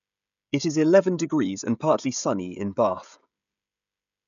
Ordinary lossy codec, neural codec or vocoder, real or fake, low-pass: none; codec, 16 kHz, 16 kbps, FreqCodec, smaller model; fake; 7.2 kHz